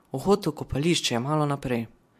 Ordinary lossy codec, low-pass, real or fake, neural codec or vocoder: MP3, 64 kbps; 14.4 kHz; fake; vocoder, 44.1 kHz, 128 mel bands every 256 samples, BigVGAN v2